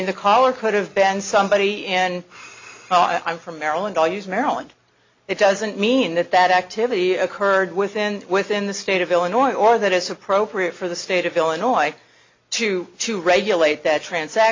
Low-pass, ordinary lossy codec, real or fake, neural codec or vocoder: 7.2 kHz; MP3, 64 kbps; real; none